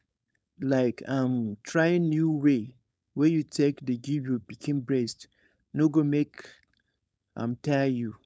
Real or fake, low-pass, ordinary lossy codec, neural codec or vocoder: fake; none; none; codec, 16 kHz, 4.8 kbps, FACodec